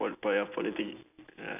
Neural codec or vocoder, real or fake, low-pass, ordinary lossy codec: none; real; 3.6 kHz; none